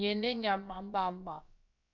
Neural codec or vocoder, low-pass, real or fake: codec, 16 kHz, about 1 kbps, DyCAST, with the encoder's durations; 7.2 kHz; fake